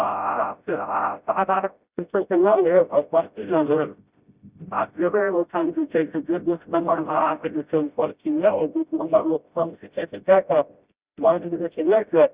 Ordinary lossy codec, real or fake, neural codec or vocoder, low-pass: Opus, 64 kbps; fake; codec, 16 kHz, 0.5 kbps, FreqCodec, smaller model; 3.6 kHz